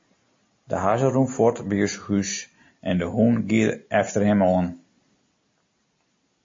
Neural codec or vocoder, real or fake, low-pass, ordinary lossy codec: none; real; 7.2 kHz; MP3, 32 kbps